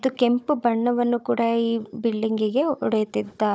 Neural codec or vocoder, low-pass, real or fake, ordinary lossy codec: codec, 16 kHz, 16 kbps, FunCodec, trained on Chinese and English, 50 frames a second; none; fake; none